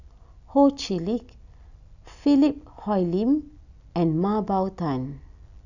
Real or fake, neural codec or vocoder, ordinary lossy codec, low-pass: real; none; none; 7.2 kHz